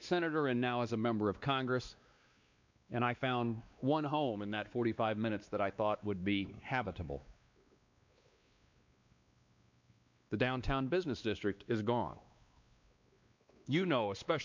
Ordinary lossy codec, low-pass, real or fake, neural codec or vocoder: MP3, 64 kbps; 7.2 kHz; fake; codec, 16 kHz, 2 kbps, X-Codec, WavLM features, trained on Multilingual LibriSpeech